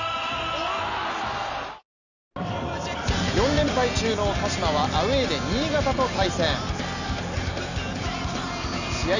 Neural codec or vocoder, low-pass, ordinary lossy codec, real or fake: none; 7.2 kHz; none; real